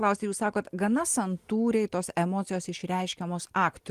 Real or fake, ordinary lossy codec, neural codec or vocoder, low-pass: real; Opus, 24 kbps; none; 14.4 kHz